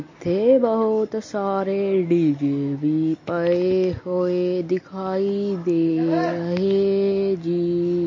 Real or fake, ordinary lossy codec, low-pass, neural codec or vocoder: real; MP3, 32 kbps; 7.2 kHz; none